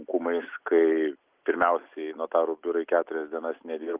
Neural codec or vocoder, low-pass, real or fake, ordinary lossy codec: none; 3.6 kHz; real; Opus, 24 kbps